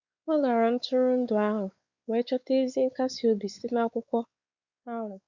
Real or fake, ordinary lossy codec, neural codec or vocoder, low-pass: fake; Opus, 64 kbps; codec, 16 kHz, 4 kbps, X-Codec, WavLM features, trained on Multilingual LibriSpeech; 7.2 kHz